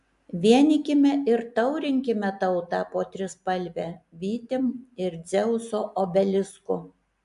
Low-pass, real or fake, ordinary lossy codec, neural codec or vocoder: 10.8 kHz; real; AAC, 96 kbps; none